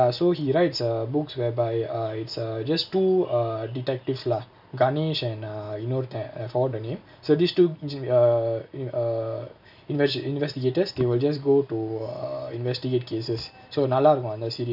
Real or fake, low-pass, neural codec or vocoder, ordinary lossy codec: real; 5.4 kHz; none; none